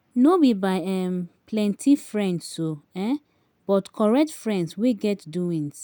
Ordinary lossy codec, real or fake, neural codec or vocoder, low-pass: none; real; none; none